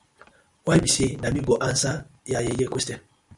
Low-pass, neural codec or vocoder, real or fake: 10.8 kHz; none; real